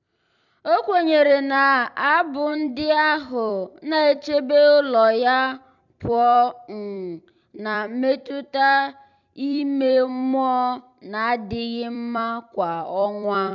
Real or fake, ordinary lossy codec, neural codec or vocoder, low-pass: fake; none; vocoder, 44.1 kHz, 128 mel bands every 256 samples, BigVGAN v2; 7.2 kHz